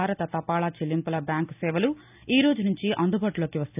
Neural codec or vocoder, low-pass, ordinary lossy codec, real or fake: none; 3.6 kHz; none; real